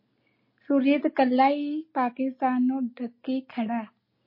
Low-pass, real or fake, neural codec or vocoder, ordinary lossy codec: 5.4 kHz; real; none; MP3, 24 kbps